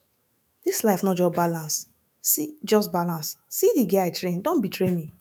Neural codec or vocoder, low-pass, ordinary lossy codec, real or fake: autoencoder, 48 kHz, 128 numbers a frame, DAC-VAE, trained on Japanese speech; none; none; fake